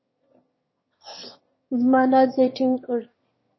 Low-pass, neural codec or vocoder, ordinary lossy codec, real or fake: 7.2 kHz; autoencoder, 22.05 kHz, a latent of 192 numbers a frame, VITS, trained on one speaker; MP3, 24 kbps; fake